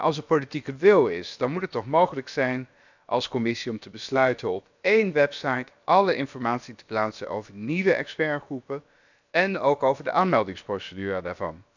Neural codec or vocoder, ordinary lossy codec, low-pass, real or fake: codec, 16 kHz, about 1 kbps, DyCAST, with the encoder's durations; none; 7.2 kHz; fake